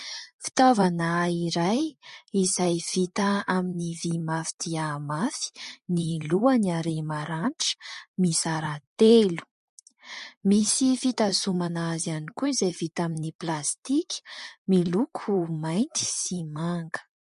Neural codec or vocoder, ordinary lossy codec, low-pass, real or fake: vocoder, 44.1 kHz, 128 mel bands, Pupu-Vocoder; MP3, 48 kbps; 14.4 kHz; fake